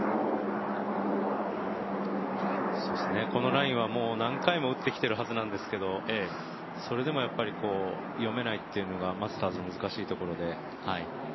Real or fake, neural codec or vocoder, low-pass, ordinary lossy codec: real; none; 7.2 kHz; MP3, 24 kbps